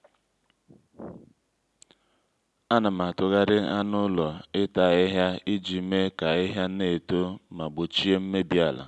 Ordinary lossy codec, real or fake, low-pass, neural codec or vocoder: none; real; none; none